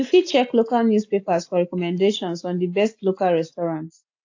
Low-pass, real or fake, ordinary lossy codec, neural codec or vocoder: 7.2 kHz; real; AAC, 48 kbps; none